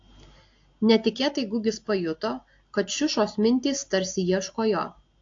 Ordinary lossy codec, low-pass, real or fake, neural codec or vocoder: AAC, 48 kbps; 7.2 kHz; real; none